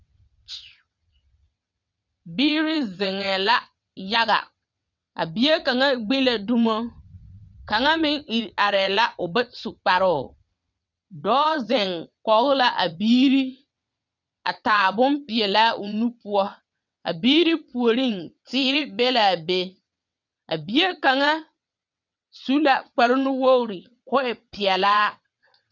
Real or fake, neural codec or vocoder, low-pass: fake; vocoder, 22.05 kHz, 80 mel bands, WaveNeXt; 7.2 kHz